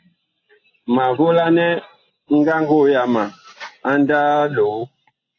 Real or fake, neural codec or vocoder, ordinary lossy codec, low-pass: real; none; AAC, 32 kbps; 7.2 kHz